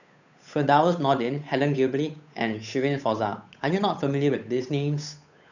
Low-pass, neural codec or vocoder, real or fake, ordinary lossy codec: 7.2 kHz; codec, 16 kHz, 8 kbps, FunCodec, trained on Chinese and English, 25 frames a second; fake; none